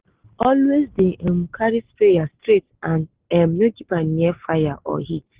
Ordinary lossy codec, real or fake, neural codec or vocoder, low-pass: Opus, 16 kbps; real; none; 3.6 kHz